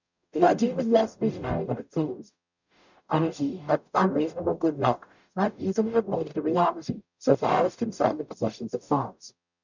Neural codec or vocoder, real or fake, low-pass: codec, 44.1 kHz, 0.9 kbps, DAC; fake; 7.2 kHz